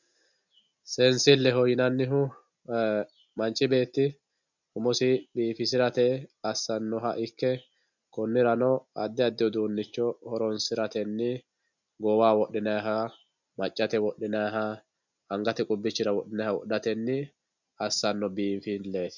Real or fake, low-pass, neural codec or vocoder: real; 7.2 kHz; none